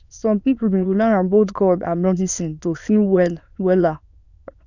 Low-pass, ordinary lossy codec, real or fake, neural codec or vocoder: 7.2 kHz; none; fake; autoencoder, 22.05 kHz, a latent of 192 numbers a frame, VITS, trained on many speakers